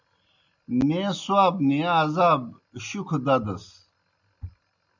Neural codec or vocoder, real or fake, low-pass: none; real; 7.2 kHz